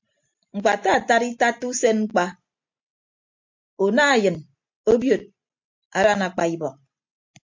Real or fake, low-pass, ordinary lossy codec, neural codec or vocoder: real; 7.2 kHz; MP3, 48 kbps; none